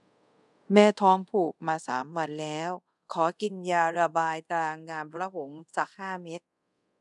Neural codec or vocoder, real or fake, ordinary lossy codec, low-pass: codec, 24 kHz, 0.5 kbps, DualCodec; fake; none; 10.8 kHz